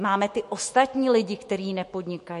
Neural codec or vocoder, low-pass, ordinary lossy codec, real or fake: autoencoder, 48 kHz, 128 numbers a frame, DAC-VAE, trained on Japanese speech; 14.4 kHz; MP3, 48 kbps; fake